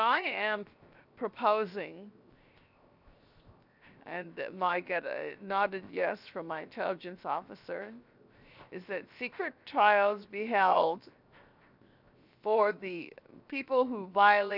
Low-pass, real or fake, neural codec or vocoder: 5.4 kHz; fake; codec, 16 kHz, 0.7 kbps, FocalCodec